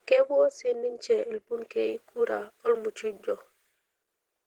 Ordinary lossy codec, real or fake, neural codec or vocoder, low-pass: Opus, 16 kbps; fake; vocoder, 48 kHz, 128 mel bands, Vocos; 19.8 kHz